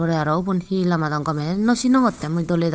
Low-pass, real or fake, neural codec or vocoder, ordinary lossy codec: none; fake; codec, 16 kHz, 8 kbps, FunCodec, trained on Chinese and English, 25 frames a second; none